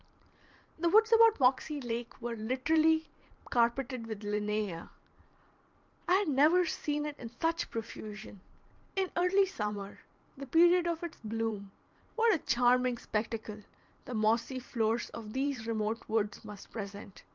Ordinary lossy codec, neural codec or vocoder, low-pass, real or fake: Opus, 24 kbps; vocoder, 44.1 kHz, 128 mel bands every 512 samples, BigVGAN v2; 7.2 kHz; fake